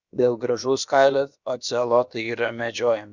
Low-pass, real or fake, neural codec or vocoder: 7.2 kHz; fake; codec, 16 kHz, about 1 kbps, DyCAST, with the encoder's durations